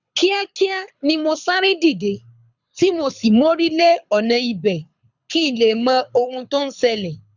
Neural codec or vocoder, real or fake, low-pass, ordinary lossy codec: codec, 24 kHz, 6 kbps, HILCodec; fake; 7.2 kHz; none